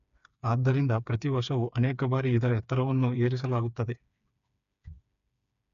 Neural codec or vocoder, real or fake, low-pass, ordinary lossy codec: codec, 16 kHz, 4 kbps, FreqCodec, smaller model; fake; 7.2 kHz; none